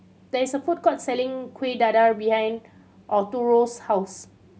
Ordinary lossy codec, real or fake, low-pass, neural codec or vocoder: none; real; none; none